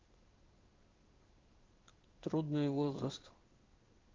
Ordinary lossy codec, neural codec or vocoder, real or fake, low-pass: Opus, 24 kbps; codec, 16 kHz in and 24 kHz out, 1 kbps, XY-Tokenizer; fake; 7.2 kHz